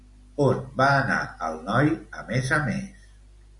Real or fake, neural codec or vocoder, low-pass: real; none; 10.8 kHz